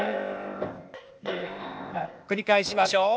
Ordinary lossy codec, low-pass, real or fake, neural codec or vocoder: none; none; fake; codec, 16 kHz, 0.8 kbps, ZipCodec